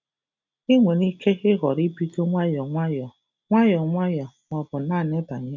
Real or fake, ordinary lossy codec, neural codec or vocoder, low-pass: real; none; none; 7.2 kHz